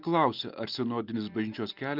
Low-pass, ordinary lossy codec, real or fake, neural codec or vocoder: 5.4 kHz; Opus, 32 kbps; real; none